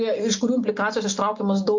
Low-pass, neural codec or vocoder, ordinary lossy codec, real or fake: 7.2 kHz; none; MP3, 48 kbps; real